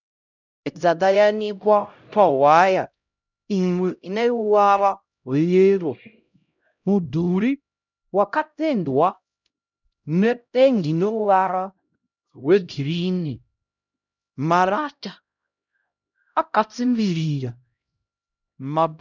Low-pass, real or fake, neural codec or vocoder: 7.2 kHz; fake; codec, 16 kHz, 0.5 kbps, X-Codec, HuBERT features, trained on LibriSpeech